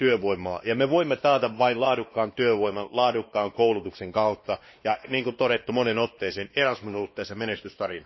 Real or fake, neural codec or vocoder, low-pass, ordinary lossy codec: fake; codec, 16 kHz, 2 kbps, X-Codec, WavLM features, trained on Multilingual LibriSpeech; 7.2 kHz; MP3, 24 kbps